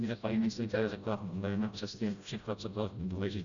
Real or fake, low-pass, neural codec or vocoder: fake; 7.2 kHz; codec, 16 kHz, 0.5 kbps, FreqCodec, smaller model